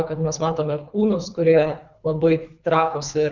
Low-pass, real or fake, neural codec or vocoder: 7.2 kHz; fake; codec, 24 kHz, 3 kbps, HILCodec